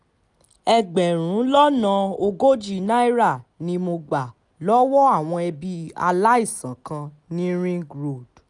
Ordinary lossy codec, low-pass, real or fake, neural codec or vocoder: none; 10.8 kHz; real; none